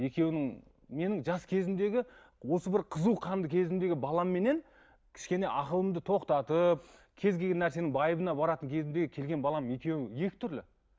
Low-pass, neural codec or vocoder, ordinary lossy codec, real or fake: none; none; none; real